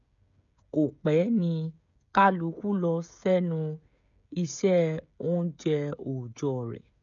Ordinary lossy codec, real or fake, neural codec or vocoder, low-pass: none; fake; codec, 16 kHz, 16 kbps, FreqCodec, smaller model; 7.2 kHz